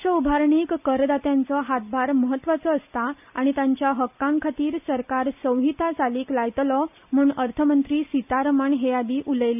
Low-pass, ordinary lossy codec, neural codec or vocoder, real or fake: 3.6 kHz; none; none; real